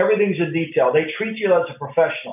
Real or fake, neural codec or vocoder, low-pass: real; none; 3.6 kHz